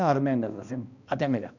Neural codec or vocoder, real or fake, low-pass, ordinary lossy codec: codec, 16 kHz, 1 kbps, X-Codec, HuBERT features, trained on balanced general audio; fake; 7.2 kHz; none